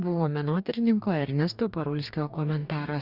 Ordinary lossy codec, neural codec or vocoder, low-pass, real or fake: Opus, 64 kbps; codec, 44.1 kHz, 2.6 kbps, DAC; 5.4 kHz; fake